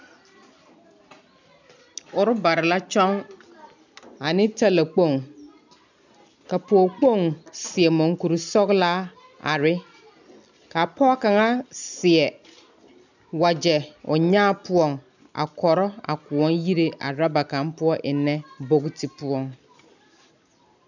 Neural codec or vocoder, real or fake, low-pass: none; real; 7.2 kHz